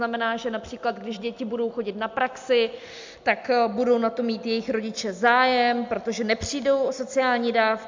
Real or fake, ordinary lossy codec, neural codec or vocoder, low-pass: real; MP3, 64 kbps; none; 7.2 kHz